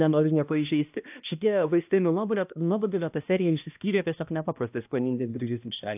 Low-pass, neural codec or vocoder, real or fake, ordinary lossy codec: 3.6 kHz; codec, 16 kHz, 1 kbps, X-Codec, HuBERT features, trained on balanced general audio; fake; AAC, 32 kbps